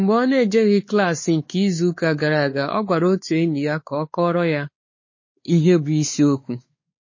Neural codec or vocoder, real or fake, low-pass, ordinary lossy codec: codec, 16 kHz, 4 kbps, X-Codec, WavLM features, trained on Multilingual LibriSpeech; fake; 7.2 kHz; MP3, 32 kbps